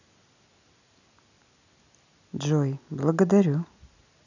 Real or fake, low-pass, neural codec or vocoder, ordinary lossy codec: real; 7.2 kHz; none; none